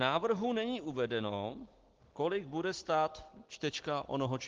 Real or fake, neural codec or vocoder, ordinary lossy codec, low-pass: real; none; Opus, 16 kbps; 7.2 kHz